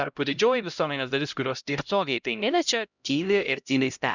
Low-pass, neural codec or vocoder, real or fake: 7.2 kHz; codec, 16 kHz, 0.5 kbps, X-Codec, HuBERT features, trained on LibriSpeech; fake